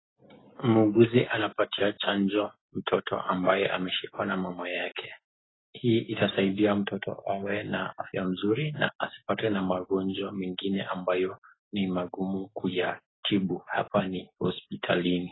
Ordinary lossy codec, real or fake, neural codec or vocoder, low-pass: AAC, 16 kbps; real; none; 7.2 kHz